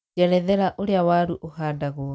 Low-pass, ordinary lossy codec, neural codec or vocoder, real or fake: none; none; none; real